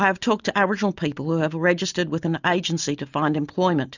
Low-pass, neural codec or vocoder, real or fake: 7.2 kHz; none; real